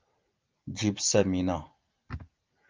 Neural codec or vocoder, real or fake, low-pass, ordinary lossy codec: none; real; 7.2 kHz; Opus, 24 kbps